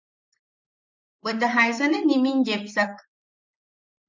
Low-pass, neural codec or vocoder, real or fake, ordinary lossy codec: 7.2 kHz; vocoder, 44.1 kHz, 128 mel bands, Pupu-Vocoder; fake; MP3, 64 kbps